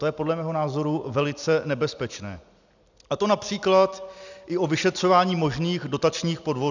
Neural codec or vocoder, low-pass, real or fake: none; 7.2 kHz; real